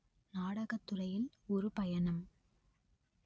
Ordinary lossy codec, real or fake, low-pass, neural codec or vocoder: none; real; none; none